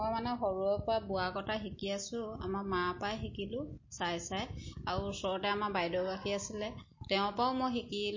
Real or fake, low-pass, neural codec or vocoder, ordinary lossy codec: real; 7.2 kHz; none; MP3, 32 kbps